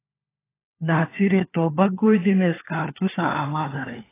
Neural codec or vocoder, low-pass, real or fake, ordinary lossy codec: codec, 16 kHz, 4 kbps, FunCodec, trained on LibriTTS, 50 frames a second; 3.6 kHz; fake; AAC, 16 kbps